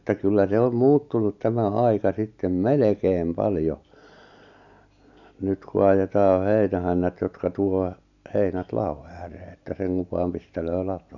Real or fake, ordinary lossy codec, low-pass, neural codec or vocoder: real; none; 7.2 kHz; none